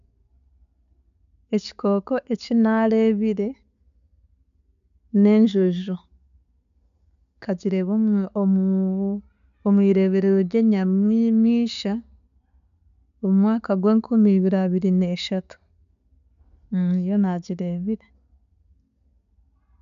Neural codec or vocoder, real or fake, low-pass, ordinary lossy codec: none; real; 7.2 kHz; none